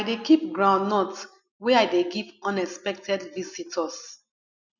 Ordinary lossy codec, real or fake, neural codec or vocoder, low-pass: none; real; none; 7.2 kHz